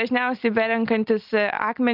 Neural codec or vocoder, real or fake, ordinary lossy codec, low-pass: none; real; Opus, 24 kbps; 5.4 kHz